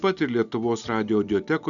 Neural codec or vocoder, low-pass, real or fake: none; 7.2 kHz; real